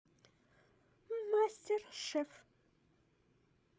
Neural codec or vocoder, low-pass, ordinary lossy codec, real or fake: codec, 16 kHz, 16 kbps, FreqCodec, larger model; none; none; fake